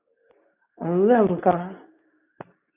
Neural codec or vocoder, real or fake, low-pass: codec, 24 kHz, 0.9 kbps, WavTokenizer, medium speech release version 2; fake; 3.6 kHz